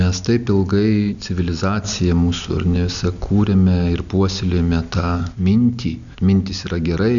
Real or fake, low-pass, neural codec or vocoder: real; 7.2 kHz; none